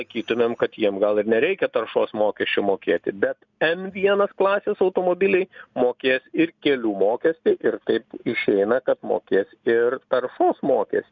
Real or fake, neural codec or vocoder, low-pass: real; none; 7.2 kHz